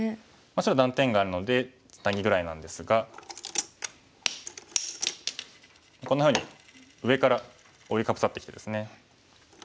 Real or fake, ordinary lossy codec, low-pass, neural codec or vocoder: real; none; none; none